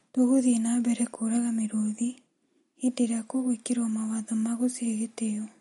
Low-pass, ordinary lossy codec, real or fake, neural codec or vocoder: 19.8 kHz; MP3, 48 kbps; real; none